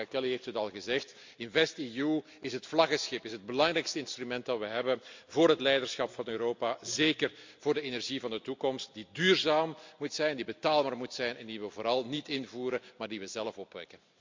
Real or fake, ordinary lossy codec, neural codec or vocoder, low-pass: real; MP3, 64 kbps; none; 7.2 kHz